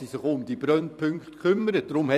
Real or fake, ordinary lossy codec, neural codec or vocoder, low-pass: fake; none; vocoder, 44.1 kHz, 128 mel bands every 256 samples, BigVGAN v2; 14.4 kHz